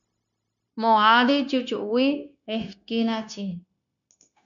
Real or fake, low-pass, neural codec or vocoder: fake; 7.2 kHz; codec, 16 kHz, 0.9 kbps, LongCat-Audio-Codec